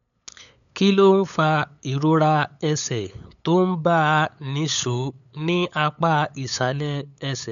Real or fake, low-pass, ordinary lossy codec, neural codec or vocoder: fake; 7.2 kHz; none; codec, 16 kHz, 8 kbps, FunCodec, trained on LibriTTS, 25 frames a second